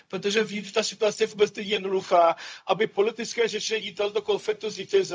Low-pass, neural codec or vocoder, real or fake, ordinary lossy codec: none; codec, 16 kHz, 0.4 kbps, LongCat-Audio-Codec; fake; none